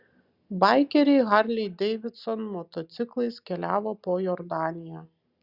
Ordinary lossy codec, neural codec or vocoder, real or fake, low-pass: Opus, 64 kbps; none; real; 5.4 kHz